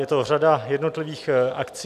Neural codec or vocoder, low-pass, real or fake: none; 14.4 kHz; real